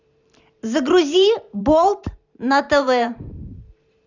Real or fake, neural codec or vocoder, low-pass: fake; vocoder, 44.1 kHz, 128 mel bands every 512 samples, BigVGAN v2; 7.2 kHz